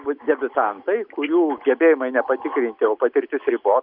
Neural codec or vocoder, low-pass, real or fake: none; 5.4 kHz; real